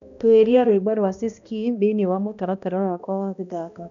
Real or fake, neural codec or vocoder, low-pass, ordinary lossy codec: fake; codec, 16 kHz, 1 kbps, X-Codec, HuBERT features, trained on balanced general audio; 7.2 kHz; none